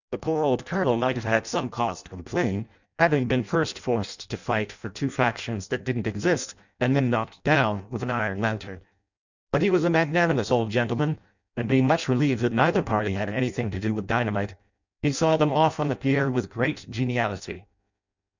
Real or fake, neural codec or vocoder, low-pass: fake; codec, 16 kHz in and 24 kHz out, 0.6 kbps, FireRedTTS-2 codec; 7.2 kHz